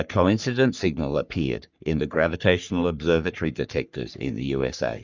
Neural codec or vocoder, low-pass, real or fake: codec, 44.1 kHz, 3.4 kbps, Pupu-Codec; 7.2 kHz; fake